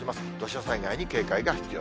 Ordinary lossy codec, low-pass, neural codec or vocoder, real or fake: none; none; none; real